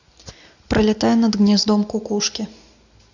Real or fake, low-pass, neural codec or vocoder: real; 7.2 kHz; none